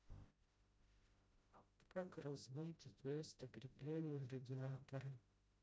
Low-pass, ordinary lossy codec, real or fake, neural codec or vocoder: none; none; fake; codec, 16 kHz, 0.5 kbps, FreqCodec, smaller model